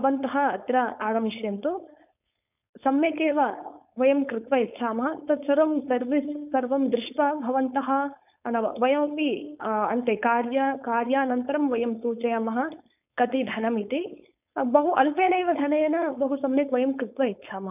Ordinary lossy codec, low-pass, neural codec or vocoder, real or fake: none; 3.6 kHz; codec, 16 kHz, 4.8 kbps, FACodec; fake